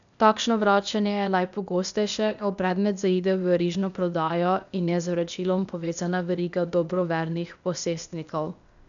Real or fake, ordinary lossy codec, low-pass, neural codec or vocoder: fake; none; 7.2 kHz; codec, 16 kHz, 0.8 kbps, ZipCodec